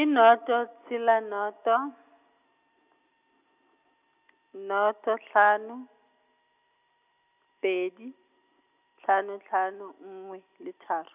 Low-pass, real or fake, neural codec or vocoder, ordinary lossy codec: 3.6 kHz; real; none; none